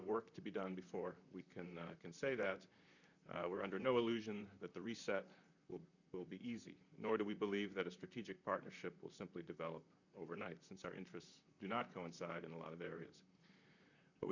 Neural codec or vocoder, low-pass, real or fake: vocoder, 44.1 kHz, 128 mel bands, Pupu-Vocoder; 7.2 kHz; fake